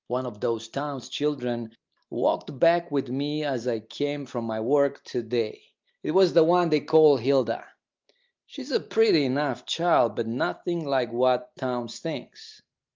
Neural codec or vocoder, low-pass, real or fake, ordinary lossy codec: none; 7.2 kHz; real; Opus, 24 kbps